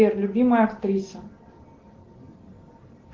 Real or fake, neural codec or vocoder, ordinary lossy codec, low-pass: real; none; Opus, 16 kbps; 7.2 kHz